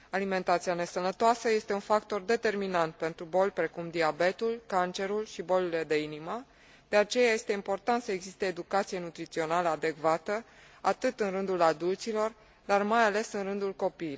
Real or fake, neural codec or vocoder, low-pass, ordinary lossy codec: real; none; none; none